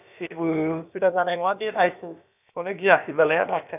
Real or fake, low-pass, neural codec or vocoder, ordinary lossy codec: fake; 3.6 kHz; codec, 16 kHz, about 1 kbps, DyCAST, with the encoder's durations; none